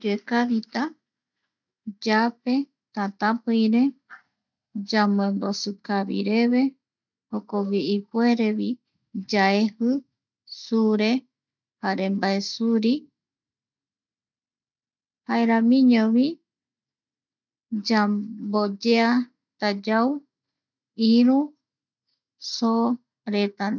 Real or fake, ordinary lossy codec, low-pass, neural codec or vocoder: real; none; 7.2 kHz; none